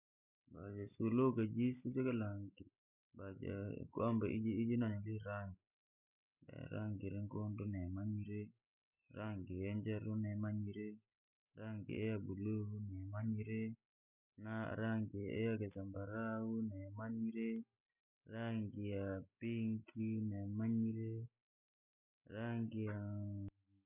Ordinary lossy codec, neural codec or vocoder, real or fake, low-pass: none; none; real; 3.6 kHz